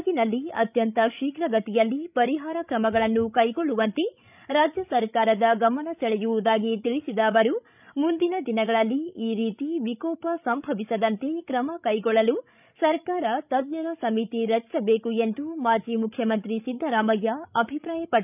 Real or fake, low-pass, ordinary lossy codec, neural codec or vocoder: fake; 3.6 kHz; none; codec, 16 kHz, 16 kbps, FreqCodec, larger model